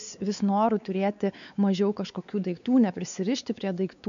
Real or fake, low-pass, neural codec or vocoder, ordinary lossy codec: fake; 7.2 kHz; codec, 16 kHz, 4 kbps, X-Codec, WavLM features, trained on Multilingual LibriSpeech; AAC, 96 kbps